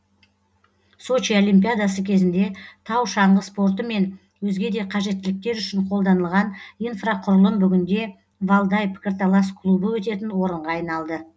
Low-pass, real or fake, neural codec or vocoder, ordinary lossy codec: none; real; none; none